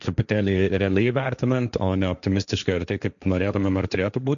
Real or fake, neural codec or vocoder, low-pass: fake; codec, 16 kHz, 1.1 kbps, Voila-Tokenizer; 7.2 kHz